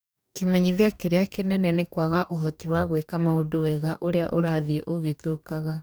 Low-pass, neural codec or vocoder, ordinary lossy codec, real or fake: none; codec, 44.1 kHz, 2.6 kbps, DAC; none; fake